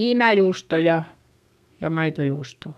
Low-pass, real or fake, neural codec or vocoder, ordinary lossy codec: 14.4 kHz; fake; codec, 32 kHz, 1.9 kbps, SNAC; none